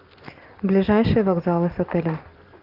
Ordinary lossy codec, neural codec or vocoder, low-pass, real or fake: Opus, 32 kbps; none; 5.4 kHz; real